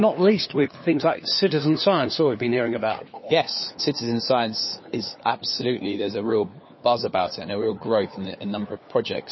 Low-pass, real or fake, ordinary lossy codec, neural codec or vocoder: 7.2 kHz; fake; MP3, 24 kbps; codec, 16 kHz, 4 kbps, FunCodec, trained on LibriTTS, 50 frames a second